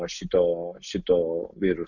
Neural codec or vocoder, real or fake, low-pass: none; real; 7.2 kHz